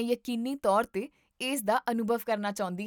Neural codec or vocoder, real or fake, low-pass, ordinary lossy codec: none; real; 19.8 kHz; none